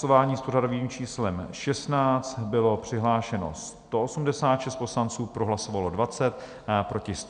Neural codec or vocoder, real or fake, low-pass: none; real; 9.9 kHz